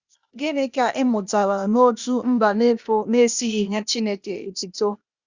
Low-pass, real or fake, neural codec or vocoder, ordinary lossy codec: 7.2 kHz; fake; codec, 16 kHz, 0.8 kbps, ZipCodec; Opus, 64 kbps